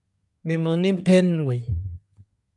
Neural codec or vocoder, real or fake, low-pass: codec, 24 kHz, 1 kbps, SNAC; fake; 10.8 kHz